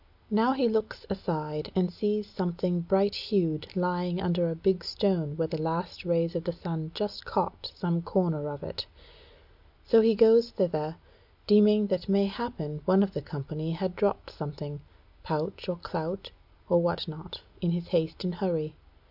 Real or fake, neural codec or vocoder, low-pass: real; none; 5.4 kHz